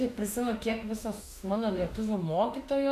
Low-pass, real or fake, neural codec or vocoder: 14.4 kHz; fake; autoencoder, 48 kHz, 32 numbers a frame, DAC-VAE, trained on Japanese speech